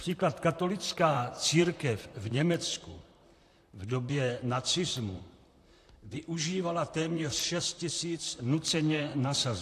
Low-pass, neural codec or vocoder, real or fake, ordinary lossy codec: 14.4 kHz; vocoder, 44.1 kHz, 128 mel bands, Pupu-Vocoder; fake; AAC, 64 kbps